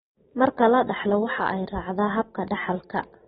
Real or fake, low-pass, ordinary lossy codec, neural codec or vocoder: real; 7.2 kHz; AAC, 16 kbps; none